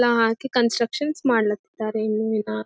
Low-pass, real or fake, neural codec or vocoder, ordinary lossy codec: none; real; none; none